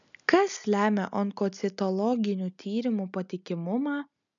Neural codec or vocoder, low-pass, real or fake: none; 7.2 kHz; real